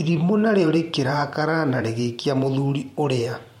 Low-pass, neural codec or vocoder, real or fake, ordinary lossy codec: 19.8 kHz; vocoder, 44.1 kHz, 128 mel bands every 512 samples, BigVGAN v2; fake; MP3, 64 kbps